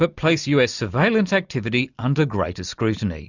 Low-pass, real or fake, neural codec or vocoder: 7.2 kHz; real; none